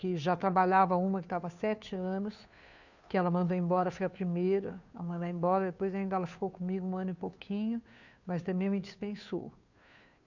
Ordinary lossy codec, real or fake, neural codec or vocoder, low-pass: none; fake; codec, 16 kHz, 2 kbps, FunCodec, trained on Chinese and English, 25 frames a second; 7.2 kHz